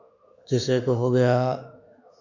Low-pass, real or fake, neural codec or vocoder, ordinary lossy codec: 7.2 kHz; fake; autoencoder, 48 kHz, 32 numbers a frame, DAC-VAE, trained on Japanese speech; AAC, 48 kbps